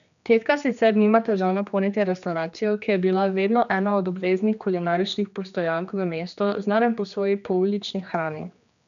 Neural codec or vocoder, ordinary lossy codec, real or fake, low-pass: codec, 16 kHz, 2 kbps, X-Codec, HuBERT features, trained on general audio; none; fake; 7.2 kHz